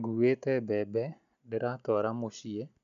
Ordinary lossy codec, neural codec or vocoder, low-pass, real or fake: none; none; 7.2 kHz; real